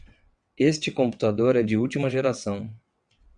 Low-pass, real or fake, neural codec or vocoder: 9.9 kHz; fake; vocoder, 22.05 kHz, 80 mel bands, WaveNeXt